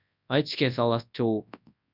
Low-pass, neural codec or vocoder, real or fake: 5.4 kHz; codec, 24 kHz, 0.9 kbps, WavTokenizer, large speech release; fake